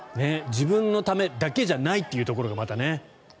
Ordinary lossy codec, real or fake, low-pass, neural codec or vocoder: none; real; none; none